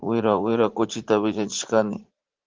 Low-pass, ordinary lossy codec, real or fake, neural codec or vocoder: 7.2 kHz; Opus, 16 kbps; fake; vocoder, 44.1 kHz, 80 mel bands, Vocos